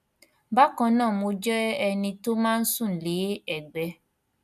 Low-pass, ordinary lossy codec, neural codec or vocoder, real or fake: 14.4 kHz; none; none; real